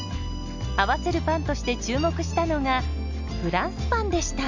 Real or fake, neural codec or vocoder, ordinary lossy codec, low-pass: real; none; none; 7.2 kHz